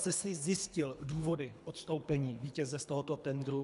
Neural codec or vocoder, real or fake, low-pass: codec, 24 kHz, 3 kbps, HILCodec; fake; 10.8 kHz